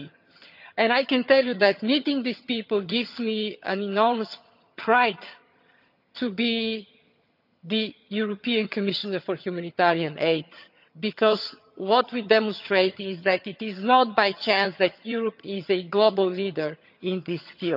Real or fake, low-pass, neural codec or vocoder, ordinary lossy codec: fake; 5.4 kHz; vocoder, 22.05 kHz, 80 mel bands, HiFi-GAN; none